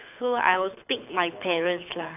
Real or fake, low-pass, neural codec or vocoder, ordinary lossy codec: fake; 3.6 kHz; codec, 24 kHz, 6 kbps, HILCodec; none